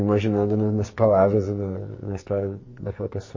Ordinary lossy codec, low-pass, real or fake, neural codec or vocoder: MP3, 32 kbps; 7.2 kHz; fake; codec, 44.1 kHz, 2.6 kbps, SNAC